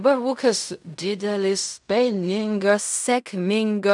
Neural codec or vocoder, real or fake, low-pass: codec, 16 kHz in and 24 kHz out, 0.4 kbps, LongCat-Audio-Codec, fine tuned four codebook decoder; fake; 10.8 kHz